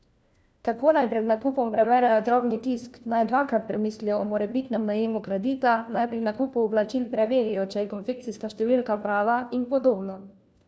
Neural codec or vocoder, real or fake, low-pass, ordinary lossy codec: codec, 16 kHz, 1 kbps, FunCodec, trained on LibriTTS, 50 frames a second; fake; none; none